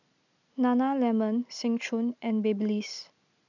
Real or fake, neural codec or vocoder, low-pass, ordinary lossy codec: real; none; 7.2 kHz; none